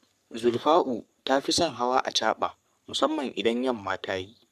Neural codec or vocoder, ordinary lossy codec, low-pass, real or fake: codec, 44.1 kHz, 3.4 kbps, Pupu-Codec; none; 14.4 kHz; fake